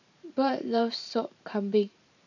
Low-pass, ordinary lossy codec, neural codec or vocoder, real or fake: 7.2 kHz; none; vocoder, 44.1 kHz, 128 mel bands every 512 samples, BigVGAN v2; fake